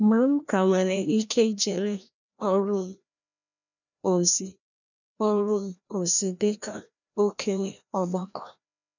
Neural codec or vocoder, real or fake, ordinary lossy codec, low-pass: codec, 16 kHz, 1 kbps, FreqCodec, larger model; fake; none; 7.2 kHz